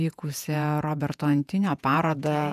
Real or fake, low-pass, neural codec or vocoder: fake; 14.4 kHz; vocoder, 48 kHz, 128 mel bands, Vocos